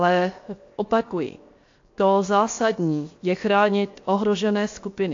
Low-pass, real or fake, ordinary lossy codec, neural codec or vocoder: 7.2 kHz; fake; AAC, 48 kbps; codec, 16 kHz, 0.7 kbps, FocalCodec